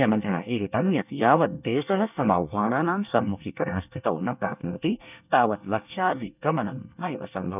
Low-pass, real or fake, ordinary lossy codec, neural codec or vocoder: 3.6 kHz; fake; none; codec, 24 kHz, 1 kbps, SNAC